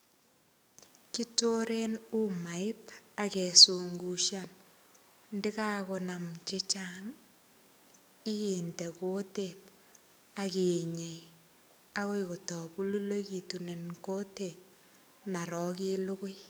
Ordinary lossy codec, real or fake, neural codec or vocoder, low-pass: none; fake; codec, 44.1 kHz, 7.8 kbps, DAC; none